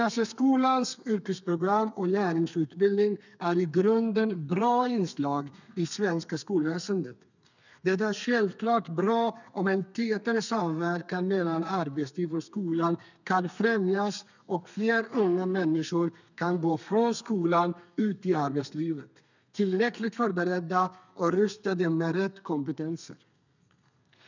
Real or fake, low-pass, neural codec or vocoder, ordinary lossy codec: fake; 7.2 kHz; codec, 32 kHz, 1.9 kbps, SNAC; none